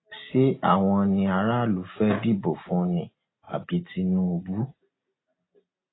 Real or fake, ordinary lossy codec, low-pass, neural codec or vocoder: real; AAC, 16 kbps; 7.2 kHz; none